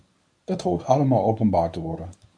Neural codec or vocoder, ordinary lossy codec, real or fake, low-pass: codec, 24 kHz, 0.9 kbps, WavTokenizer, medium speech release version 1; AAC, 64 kbps; fake; 9.9 kHz